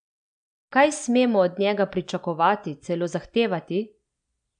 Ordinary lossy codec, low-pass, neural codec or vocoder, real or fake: none; 9.9 kHz; none; real